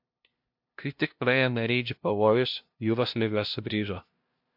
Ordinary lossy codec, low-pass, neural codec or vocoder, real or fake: MP3, 48 kbps; 5.4 kHz; codec, 16 kHz, 0.5 kbps, FunCodec, trained on LibriTTS, 25 frames a second; fake